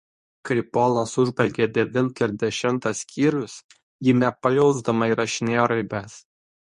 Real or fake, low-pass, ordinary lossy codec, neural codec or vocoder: fake; 10.8 kHz; MP3, 48 kbps; codec, 24 kHz, 0.9 kbps, WavTokenizer, medium speech release version 2